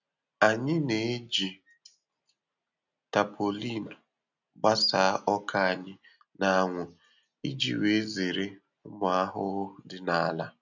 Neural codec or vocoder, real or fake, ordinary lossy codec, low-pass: none; real; none; 7.2 kHz